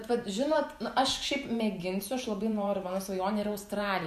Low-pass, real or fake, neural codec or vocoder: 14.4 kHz; fake; vocoder, 48 kHz, 128 mel bands, Vocos